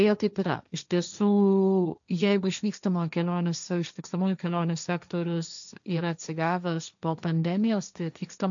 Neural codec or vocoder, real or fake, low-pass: codec, 16 kHz, 1.1 kbps, Voila-Tokenizer; fake; 7.2 kHz